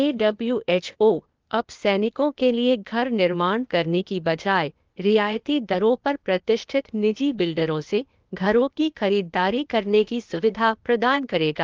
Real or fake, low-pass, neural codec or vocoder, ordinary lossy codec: fake; 7.2 kHz; codec, 16 kHz, 0.8 kbps, ZipCodec; Opus, 24 kbps